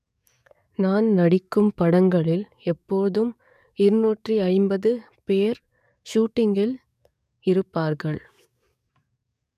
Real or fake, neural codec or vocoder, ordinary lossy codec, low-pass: fake; codec, 44.1 kHz, 7.8 kbps, DAC; none; 14.4 kHz